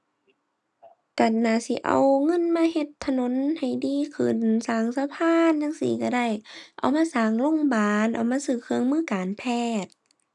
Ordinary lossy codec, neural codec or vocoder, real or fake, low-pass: none; none; real; none